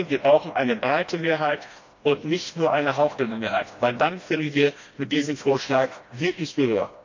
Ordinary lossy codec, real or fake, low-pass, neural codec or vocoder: MP3, 48 kbps; fake; 7.2 kHz; codec, 16 kHz, 1 kbps, FreqCodec, smaller model